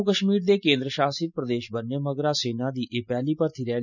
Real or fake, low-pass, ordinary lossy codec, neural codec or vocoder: real; 7.2 kHz; none; none